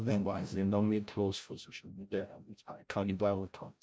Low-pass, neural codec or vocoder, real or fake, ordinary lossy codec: none; codec, 16 kHz, 0.5 kbps, FreqCodec, larger model; fake; none